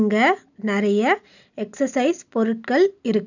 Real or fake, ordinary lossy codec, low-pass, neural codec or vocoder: real; none; 7.2 kHz; none